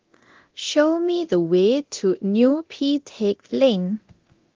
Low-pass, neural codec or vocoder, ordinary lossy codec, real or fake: 7.2 kHz; codec, 24 kHz, 0.9 kbps, DualCodec; Opus, 16 kbps; fake